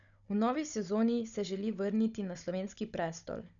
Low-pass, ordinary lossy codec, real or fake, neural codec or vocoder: 7.2 kHz; none; fake; codec, 16 kHz, 16 kbps, FunCodec, trained on Chinese and English, 50 frames a second